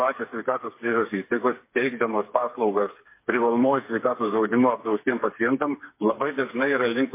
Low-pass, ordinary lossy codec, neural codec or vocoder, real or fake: 3.6 kHz; MP3, 24 kbps; codec, 16 kHz, 4 kbps, FreqCodec, smaller model; fake